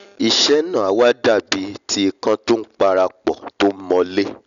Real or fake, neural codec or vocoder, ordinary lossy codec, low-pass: real; none; none; 7.2 kHz